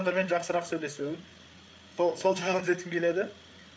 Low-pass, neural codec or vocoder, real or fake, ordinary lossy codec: none; codec, 16 kHz, 8 kbps, FreqCodec, larger model; fake; none